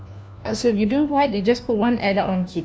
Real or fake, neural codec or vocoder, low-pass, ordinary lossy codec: fake; codec, 16 kHz, 1 kbps, FunCodec, trained on LibriTTS, 50 frames a second; none; none